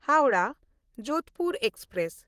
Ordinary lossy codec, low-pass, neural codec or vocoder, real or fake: Opus, 24 kbps; 14.4 kHz; vocoder, 44.1 kHz, 128 mel bands, Pupu-Vocoder; fake